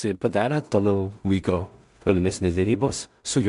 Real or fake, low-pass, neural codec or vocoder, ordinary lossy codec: fake; 10.8 kHz; codec, 16 kHz in and 24 kHz out, 0.4 kbps, LongCat-Audio-Codec, two codebook decoder; MP3, 64 kbps